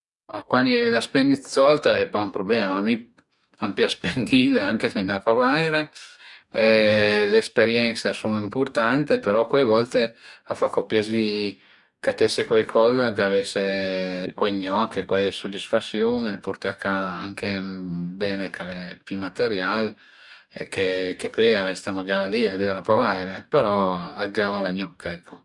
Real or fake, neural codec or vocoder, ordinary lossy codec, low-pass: fake; codec, 44.1 kHz, 2.6 kbps, DAC; none; 10.8 kHz